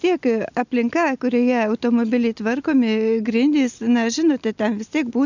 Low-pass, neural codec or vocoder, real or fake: 7.2 kHz; none; real